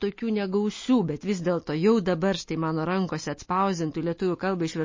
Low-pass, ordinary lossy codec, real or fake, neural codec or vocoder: 7.2 kHz; MP3, 32 kbps; real; none